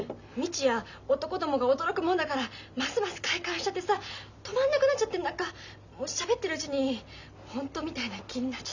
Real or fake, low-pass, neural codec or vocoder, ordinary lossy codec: real; 7.2 kHz; none; none